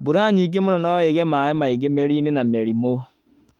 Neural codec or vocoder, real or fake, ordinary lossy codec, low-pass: autoencoder, 48 kHz, 32 numbers a frame, DAC-VAE, trained on Japanese speech; fake; Opus, 32 kbps; 19.8 kHz